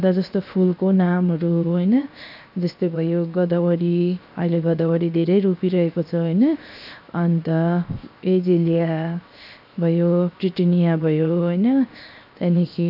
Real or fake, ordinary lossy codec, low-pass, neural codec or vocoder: fake; none; 5.4 kHz; codec, 16 kHz, 0.7 kbps, FocalCodec